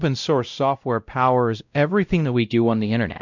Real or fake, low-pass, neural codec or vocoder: fake; 7.2 kHz; codec, 16 kHz, 0.5 kbps, X-Codec, WavLM features, trained on Multilingual LibriSpeech